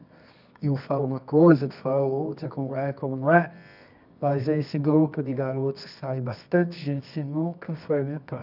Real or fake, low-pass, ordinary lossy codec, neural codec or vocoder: fake; 5.4 kHz; none; codec, 24 kHz, 0.9 kbps, WavTokenizer, medium music audio release